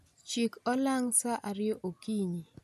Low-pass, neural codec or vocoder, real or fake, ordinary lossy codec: 14.4 kHz; none; real; none